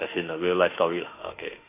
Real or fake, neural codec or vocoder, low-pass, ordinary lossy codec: fake; autoencoder, 48 kHz, 32 numbers a frame, DAC-VAE, trained on Japanese speech; 3.6 kHz; AAC, 24 kbps